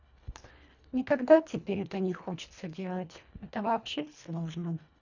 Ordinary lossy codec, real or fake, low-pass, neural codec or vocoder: none; fake; 7.2 kHz; codec, 24 kHz, 1.5 kbps, HILCodec